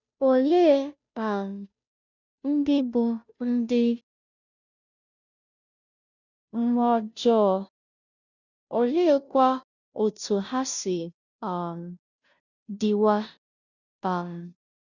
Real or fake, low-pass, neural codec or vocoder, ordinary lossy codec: fake; 7.2 kHz; codec, 16 kHz, 0.5 kbps, FunCodec, trained on Chinese and English, 25 frames a second; none